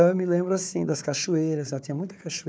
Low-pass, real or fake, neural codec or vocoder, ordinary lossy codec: none; fake; codec, 16 kHz, 4 kbps, FunCodec, trained on Chinese and English, 50 frames a second; none